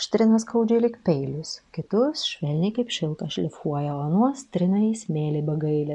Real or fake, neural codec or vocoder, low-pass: real; none; 10.8 kHz